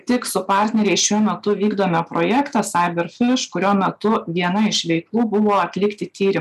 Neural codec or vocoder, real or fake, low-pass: none; real; 14.4 kHz